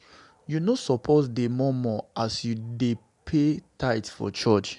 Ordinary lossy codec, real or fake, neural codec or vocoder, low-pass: none; real; none; 10.8 kHz